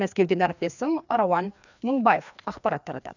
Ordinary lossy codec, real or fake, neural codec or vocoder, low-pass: none; fake; codec, 16 kHz, 2 kbps, FreqCodec, larger model; 7.2 kHz